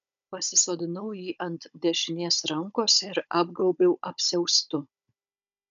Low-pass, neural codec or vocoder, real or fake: 7.2 kHz; codec, 16 kHz, 16 kbps, FunCodec, trained on Chinese and English, 50 frames a second; fake